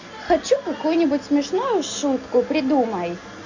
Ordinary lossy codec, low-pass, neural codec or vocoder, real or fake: Opus, 64 kbps; 7.2 kHz; none; real